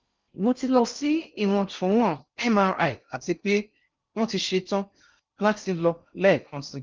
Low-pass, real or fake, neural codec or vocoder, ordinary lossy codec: 7.2 kHz; fake; codec, 16 kHz in and 24 kHz out, 0.6 kbps, FocalCodec, streaming, 4096 codes; Opus, 24 kbps